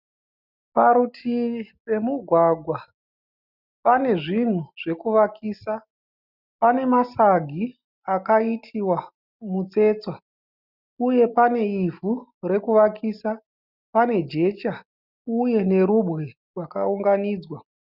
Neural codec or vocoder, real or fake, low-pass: none; real; 5.4 kHz